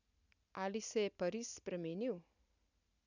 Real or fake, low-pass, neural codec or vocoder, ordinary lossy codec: real; 7.2 kHz; none; none